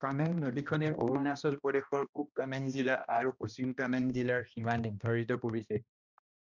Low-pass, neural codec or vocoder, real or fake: 7.2 kHz; codec, 16 kHz, 1 kbps, X-Codec, HuBERT features, trained on balanced general audio; fake